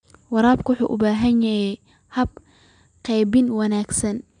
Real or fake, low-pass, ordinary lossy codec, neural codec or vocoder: real; 9.9 kHz; none; none